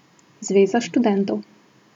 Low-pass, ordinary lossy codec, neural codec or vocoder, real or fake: 19.8 kHz; none; none; real